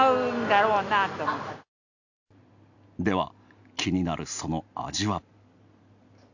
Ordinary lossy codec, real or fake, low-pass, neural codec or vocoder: none; real; 7.2 kHz; none